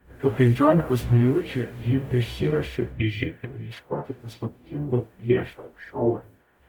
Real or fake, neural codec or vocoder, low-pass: fake; codec, 44.1 kHz, 0.9 kbps, DAC; 19.8 kHz